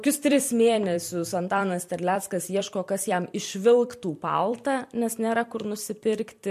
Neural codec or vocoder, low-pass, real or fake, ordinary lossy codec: vocoder, 44.1 kHz, 128 mel bands every 256 samples, BigVGAN v2; 14.4 kHz; fake; MP3, 64 kbps